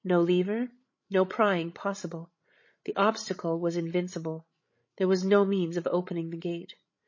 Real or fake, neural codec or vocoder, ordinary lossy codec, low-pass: fake; codec, 16 kHz, 16 kbps, FreqCodec, larger model; MP3, 32 kbps; 7.2 kHz